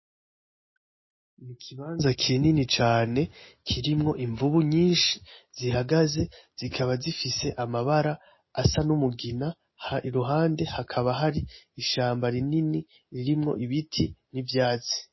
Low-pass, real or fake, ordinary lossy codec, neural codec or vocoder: 7.2 kHz; real; MP3, 24 kbps; none